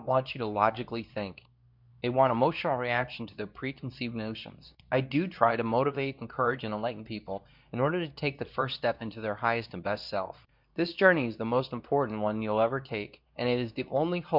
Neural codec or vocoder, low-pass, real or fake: codec, 24 kHz, 0.9 kbps, WavTokenizer, medium speech release version 2; 5.4 kHz; fake